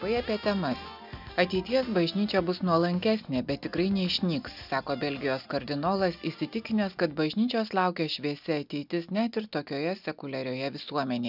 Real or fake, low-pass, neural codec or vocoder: real; 5.4 kHz; none